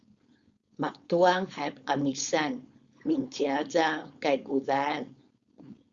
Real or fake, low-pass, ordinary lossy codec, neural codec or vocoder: fake; 7.2 kHz; Opus, 64 kbps; codec, 16 kHz, 4.8 kbps, FACodec